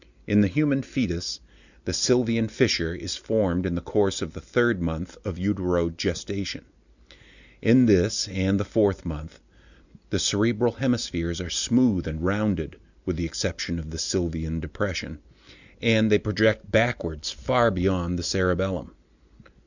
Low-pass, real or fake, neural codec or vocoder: 7.2 kHz; real; none